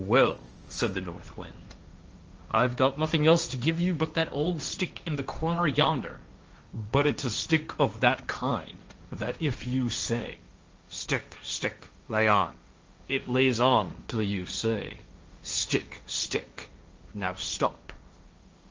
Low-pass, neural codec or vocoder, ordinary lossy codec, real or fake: 7.2 kHz; codec, 16 kHz, 1.1 kbps, Voila-Tokenizer; Opus, 24 kbps; fake